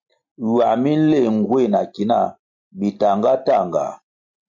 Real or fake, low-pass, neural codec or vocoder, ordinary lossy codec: real; 7.2 kHz; none; MP3, 48 kbps